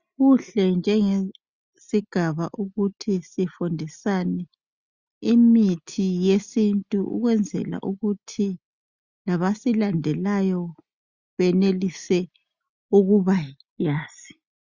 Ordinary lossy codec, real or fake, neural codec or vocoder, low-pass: Opus, 64 kbps; real; none; 7.2 kHz